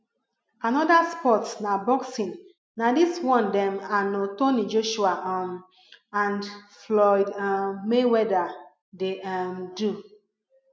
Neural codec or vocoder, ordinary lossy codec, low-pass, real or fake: none; none; none; real